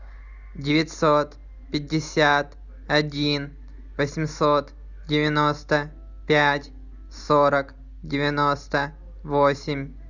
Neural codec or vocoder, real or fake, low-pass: none; real; 7.2 kHz